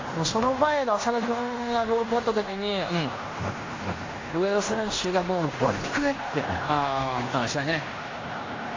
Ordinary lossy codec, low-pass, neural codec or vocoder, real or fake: AAC, 32 kbps; 7.2 kHz; codec, 16 kHz in and 24 kHz out, 0.9 kbps, LongCat-Audio-Codec, fine tuned four codebook decoder; fake